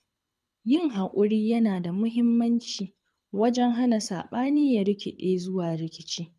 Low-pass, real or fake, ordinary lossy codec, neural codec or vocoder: none; fake; none; codec, 24 kHz, 6 kbps, HILCodec